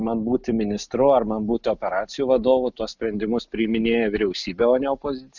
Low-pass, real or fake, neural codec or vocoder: 7.2 kHz; real; none